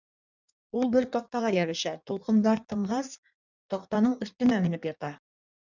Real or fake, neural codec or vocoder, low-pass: fake; codec, 16 kHz in and 24 kHz out, 1.1 kbps, FireRedTTS-2 codec; 7.2 kHz